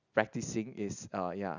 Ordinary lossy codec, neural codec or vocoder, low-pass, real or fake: none; none; 7.2 kHz; real